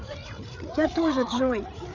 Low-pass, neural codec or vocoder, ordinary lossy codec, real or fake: 7.2 kHz; codec, 16 kHz, 8 kbps, FreqCodec, larger model; none; fake